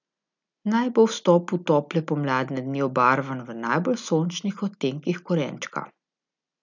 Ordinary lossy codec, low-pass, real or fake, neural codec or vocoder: none; 7.2 kHz; real; none